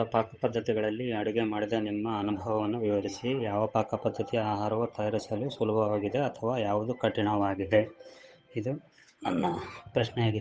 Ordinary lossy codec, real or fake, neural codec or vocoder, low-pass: none; fake; codec, 16 kHz, 8 kbps, FunCodec, trained on Chinese and English, 25 frames a second; none